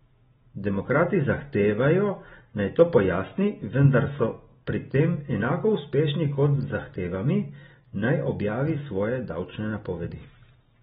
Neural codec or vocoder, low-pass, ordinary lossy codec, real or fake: none; 7.2 kHz; AAC, 16 kbps; real